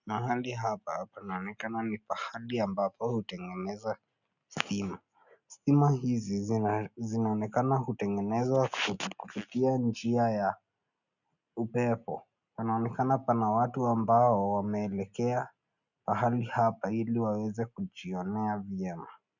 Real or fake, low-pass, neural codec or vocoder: real; 7.2 kHz; none